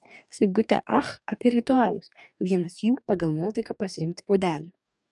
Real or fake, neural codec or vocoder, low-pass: fake; codec, 44.1 kHz, 2.6 kbps, DAC; 10.8 kHz